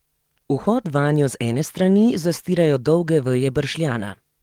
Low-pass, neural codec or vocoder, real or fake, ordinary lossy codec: 19.8 kHz; codec, 44.1 kHz, 7.8 kbps, DAC; fake; Opus, 24 kbps